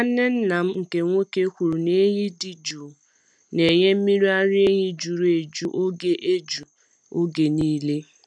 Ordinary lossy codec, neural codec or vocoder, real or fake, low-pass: none; none; real; none